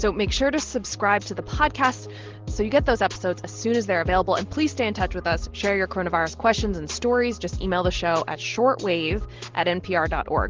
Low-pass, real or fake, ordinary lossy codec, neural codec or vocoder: 7.2 kHz; real; Opus, 16 kbps; none